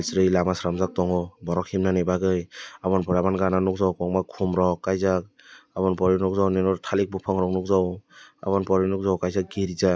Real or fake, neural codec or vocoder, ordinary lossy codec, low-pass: real; none; none; none